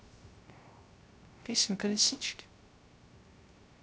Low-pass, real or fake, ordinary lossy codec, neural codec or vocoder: none; fake; none; codec, 16 kHz, 0.3 kbps, FocalCodec